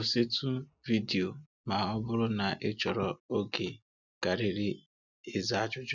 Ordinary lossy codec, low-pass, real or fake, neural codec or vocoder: none; 7.2 kHz; real; none